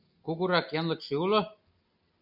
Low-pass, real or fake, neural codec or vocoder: 5.4 kHz; real; none